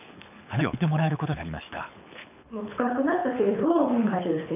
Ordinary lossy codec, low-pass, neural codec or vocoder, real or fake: none; 3.6 kHz; codec, 24 kHz, 6 kbps, HILCodec; fake